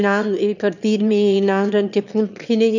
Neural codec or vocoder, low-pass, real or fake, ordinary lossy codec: autoencoder, 22.05 kHz, a latent of 192 numbers a frame, VITS, trained on one speaker; 7.2 kHz; fake; none